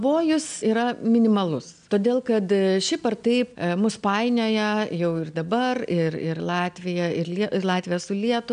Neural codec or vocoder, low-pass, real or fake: none; 9.9 kHz; real